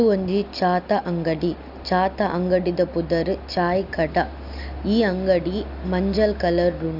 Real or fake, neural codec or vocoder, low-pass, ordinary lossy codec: real; none; 5.4 kHz; none